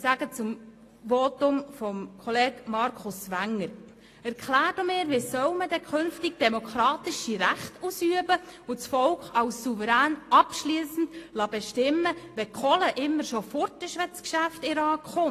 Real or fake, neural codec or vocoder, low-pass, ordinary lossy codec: real; none; 14.4 kHz; AAC, 48 kbps